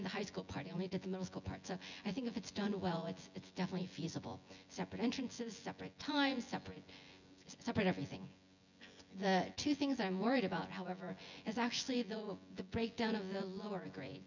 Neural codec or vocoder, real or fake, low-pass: vocoder, 24 kHz, 100 mel bands, Vocos; fake; 7.2 kHz